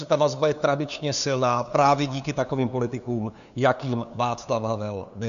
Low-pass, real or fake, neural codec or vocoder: 7.2 kHz; fake; codec, 16 kHz, 2 kbps, FunCodec, trained on LibriTTS, 25 frames a second